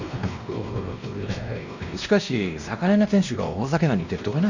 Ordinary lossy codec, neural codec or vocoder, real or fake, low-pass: none; codec, 16 kHz, 1 kbps, X-Codec, WavLM features, trained on Multilingual LibriSpeech; fake; 7.2 kHz